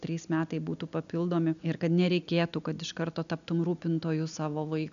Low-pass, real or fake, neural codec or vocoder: 7.2 kHz; real; none